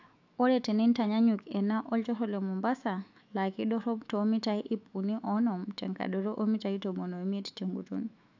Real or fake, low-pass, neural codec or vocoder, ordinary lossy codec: fake; 7.2 kHz; autoencoder, 48 kHz, 128 numbers a frame, DAC-VAE, trained on Japanese speech; AAC, 48 kbps